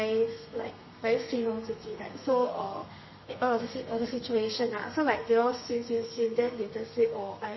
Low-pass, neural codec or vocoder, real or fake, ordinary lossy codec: 7.2 kHz; codec, 32 kHz, 1.9 kbps, SNAC; fake; MP3, 24 kbps